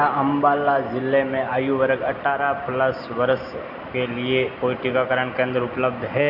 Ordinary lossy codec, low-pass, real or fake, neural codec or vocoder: none; 5.4 kHz; real; none